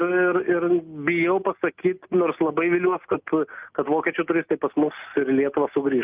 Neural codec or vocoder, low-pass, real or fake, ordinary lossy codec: none; 3.6 kHz; real; Opus, 24 kbps